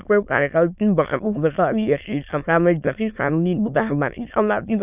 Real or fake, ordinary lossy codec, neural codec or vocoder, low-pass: fake; none; autoencoder, 22.05 kHz, a latent of 192 numbers a frame, VITS, trained on many speakers; 3.6 kHz